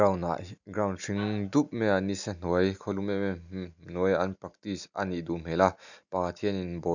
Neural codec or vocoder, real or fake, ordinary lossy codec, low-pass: none; real; none; 7.2 kHz